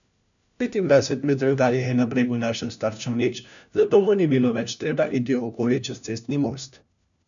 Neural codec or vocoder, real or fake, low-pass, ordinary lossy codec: codec, 16 kHz, 1 kbps, FunCodec, trained on LibriTTS, 50 frames a second; fake; 7.2 kHz; none